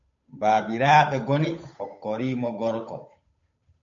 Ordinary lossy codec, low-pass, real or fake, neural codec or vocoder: MP3, 48 kbps; 7.2 kHz; fake; codec, 16 kHz, 8 kbps, FunCodec, trained on Chinese and English, 25 frames a second